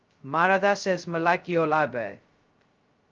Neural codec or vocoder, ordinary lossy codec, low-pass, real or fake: codec, 16 kHz, 0.2 kbps, FocalCodec; Opus, 24 kbps; 7.2 kHz; fake